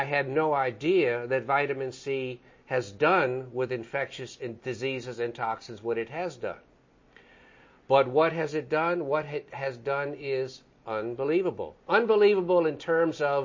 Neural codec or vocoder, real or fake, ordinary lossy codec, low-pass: none; real; MP3, 32 kbps; 7.2 kHz